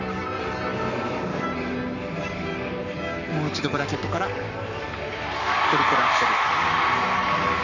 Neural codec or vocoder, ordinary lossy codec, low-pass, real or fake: codec, 44.1 kHz, 7.8 kbps, Pupu-Codec; none; 7.2 kHz; fake